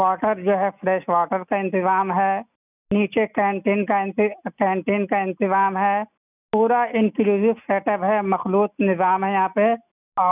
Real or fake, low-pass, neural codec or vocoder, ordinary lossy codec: real; 3.6 kHz; none; none